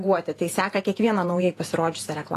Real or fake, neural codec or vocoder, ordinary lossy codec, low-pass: fake; vocoder, 48 kHz, 128 mel bands, Vocos; AAC, 48 kbps; 14.4 kHz